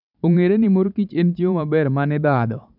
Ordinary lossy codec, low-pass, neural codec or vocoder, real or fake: none; 5.4 kHz; vocoder, 44.1 kHz, 128 mel bands every 512 samples, BigVGAN v2; fake